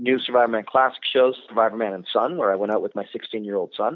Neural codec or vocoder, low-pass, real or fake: none; 7.2 kHz; real